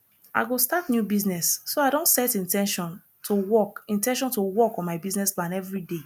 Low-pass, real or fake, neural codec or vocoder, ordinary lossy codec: none; real; none; none